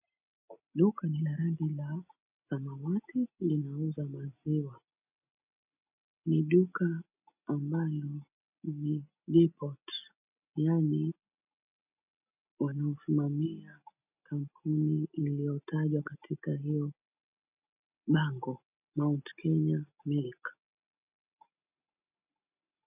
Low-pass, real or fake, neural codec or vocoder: 3.6 kHz; real; none